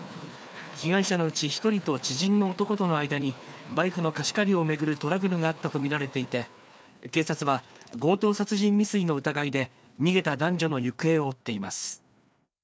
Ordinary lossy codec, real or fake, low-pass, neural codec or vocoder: none; fake; none; codec, 16 kHz, 2 kbps, FreqCodec, larger model